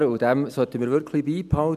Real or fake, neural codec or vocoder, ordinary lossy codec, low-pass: real; none; none; 14.4 kHz